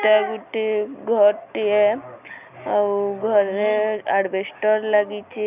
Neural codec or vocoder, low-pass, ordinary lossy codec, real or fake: none; 3.6 kHz; none; real